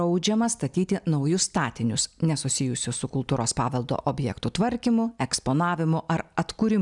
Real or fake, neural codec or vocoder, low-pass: real; none; 10.8 kHz